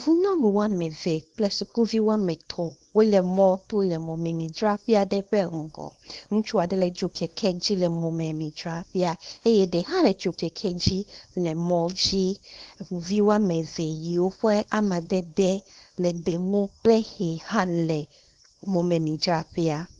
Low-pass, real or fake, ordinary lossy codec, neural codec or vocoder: 10.8 kHz; fake; Opus, 24 kbps; codec, 24 kHz, 0.9 kbps, WavTokenizer, small release